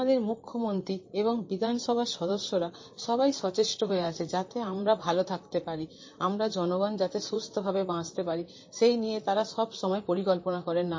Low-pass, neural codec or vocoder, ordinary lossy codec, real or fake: 7.2 kHz; vocoder, 44.1 kHz, 128 mel bands, Pupu-Vocoder; MP3, 32 kbps; fake